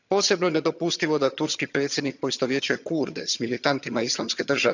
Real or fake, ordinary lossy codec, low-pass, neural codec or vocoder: fake; none; 7.2 kHz; vocoder, 22.05 kHz, 80 mel bands, HiFi-GAN